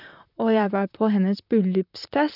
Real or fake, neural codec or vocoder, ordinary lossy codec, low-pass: fake; vocoder, 44.1 kHz, 128 mel bands, Pupu-Vocoder; none; 5.4 kHz